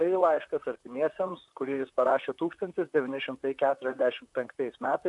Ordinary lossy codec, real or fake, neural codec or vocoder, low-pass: AAC, 64 kbps; fake; vocoder, 44.1 kHz, 128 mel bands, Pupu-Vocoder; 10.8 kHz